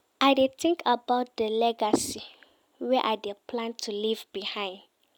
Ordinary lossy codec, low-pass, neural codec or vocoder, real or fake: none; none; none; real